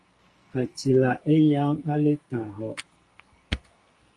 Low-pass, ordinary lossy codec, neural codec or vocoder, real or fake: 10.8 kHz; Opus, 32 kbps; codec, 44.1 kHz, 2.6 kbps, SNAC; fake